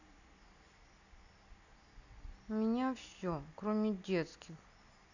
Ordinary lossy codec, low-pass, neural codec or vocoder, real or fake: none; 7.2 kHz; none; real